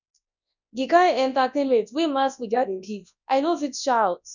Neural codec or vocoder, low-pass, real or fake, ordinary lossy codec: codec, 24 kHz, 0.9 kbps, WavTokenizer, large speech release; 7.2 kHz; fake; none